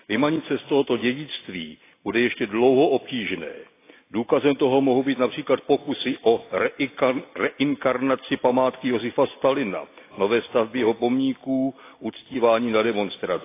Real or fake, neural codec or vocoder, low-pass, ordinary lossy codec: real; none; 3.6 kHz; AAC, 24 kbps